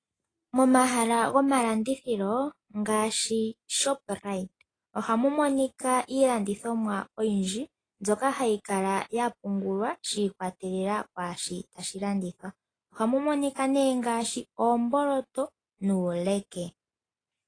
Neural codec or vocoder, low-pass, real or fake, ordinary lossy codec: none; 9.9 kHz; real; AAC, 32 kbps